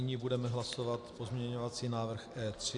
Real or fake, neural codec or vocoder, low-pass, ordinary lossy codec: real; none; 10.8 kHz; Opus, 64 kbps